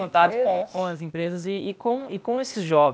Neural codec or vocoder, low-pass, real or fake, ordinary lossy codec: codec, 16 kHz, 0.8 kbps, ZipCodec; none; fake; none